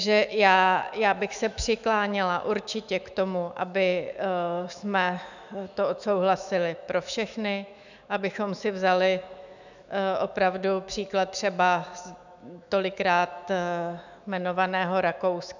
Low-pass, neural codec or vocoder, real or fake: 7.2 kHz; autoencoder, 48 kHz, 128 numbers a frame, DAC-VAE, trained on Japanese speech; fake